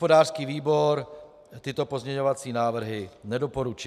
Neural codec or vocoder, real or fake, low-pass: none; real; 14.4 kHz